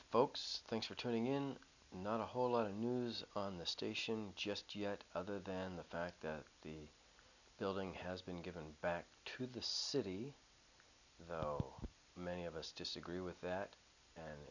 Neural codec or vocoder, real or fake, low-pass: none; real; 7.2 kHz